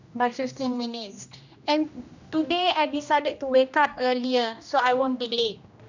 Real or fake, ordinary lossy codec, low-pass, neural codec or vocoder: fake; none; 7.2 kHz; codec, 16 kHz, 1 kbps, X-Codec, HuBERT features, trained on general audio